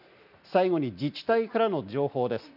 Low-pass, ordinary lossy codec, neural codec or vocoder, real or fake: 5.4 kHz; none; none; real